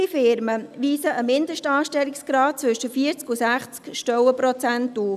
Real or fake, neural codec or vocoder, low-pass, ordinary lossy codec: real; none; 14.4 kHz; none